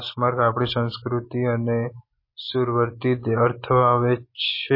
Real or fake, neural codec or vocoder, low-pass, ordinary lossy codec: real; none; 5.4 kHz; MP3, 24 kbps